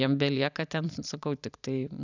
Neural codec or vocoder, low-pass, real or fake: vocoder, 44.1 kHz, 128 mel bands every 256 samples, BigVGAN v2; 7.2 kHz; fake